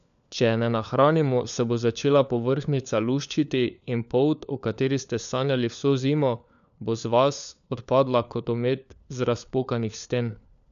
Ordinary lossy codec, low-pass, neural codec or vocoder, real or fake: none; 7.2 kHz; codec, 16 kHz, 4 kbps, FunCodec, trained on LibriTTS, 50 frames a second; fake